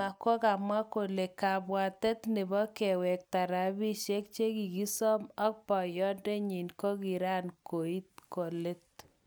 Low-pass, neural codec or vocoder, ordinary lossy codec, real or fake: none; none; none; real